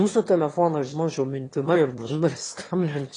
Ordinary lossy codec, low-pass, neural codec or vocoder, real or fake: AAC, 32 kbps; 9.9 kHz; autoencoder, 22.05 kHz, a latent of 192 numbers a frame, VITS, trained on one speaker; fake